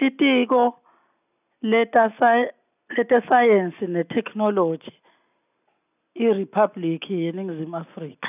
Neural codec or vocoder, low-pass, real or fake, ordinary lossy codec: none; 3.6 kHz; real; none